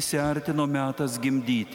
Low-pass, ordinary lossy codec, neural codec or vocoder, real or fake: 19.8 kHz; MP3, 96 kbps; none; real